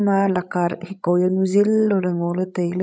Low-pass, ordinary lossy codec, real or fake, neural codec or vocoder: none; none; fake; codec, 16 kHz, 16 kbps, FreqCodec, larger model